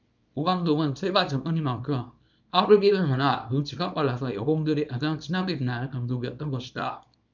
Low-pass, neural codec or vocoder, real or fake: 7.2 kHz; codec, 24 kHz, 0.9 kbps, WavTokenizer, small release; fake